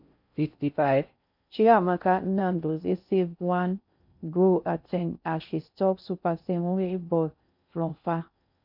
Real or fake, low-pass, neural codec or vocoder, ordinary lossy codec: fake; 5.4 kHz; codec, 16 kHz in and 24 kHz out, 0.6 kbps, FocalCodec, streaming, 4096 codes; none